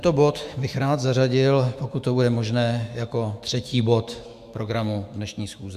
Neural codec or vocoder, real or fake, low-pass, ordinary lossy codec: autoencoder, 48 kHz, 128 numbers a frame, DAC-VAE, trained on Japanese speech; fake; 14.4 kHz; Opus, 64 kbps